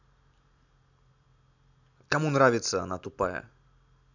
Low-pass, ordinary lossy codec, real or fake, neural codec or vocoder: 7.2 kHz; none; real; none